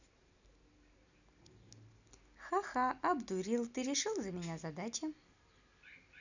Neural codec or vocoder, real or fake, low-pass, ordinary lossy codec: none; real; 7.2 kHz; none